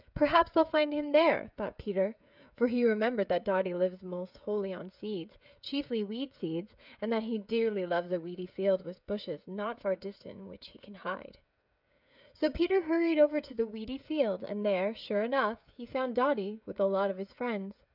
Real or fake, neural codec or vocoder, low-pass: fake; codec, 16 kHz, 16 kbps, FreqCodec, smaller model; 5.4 kHz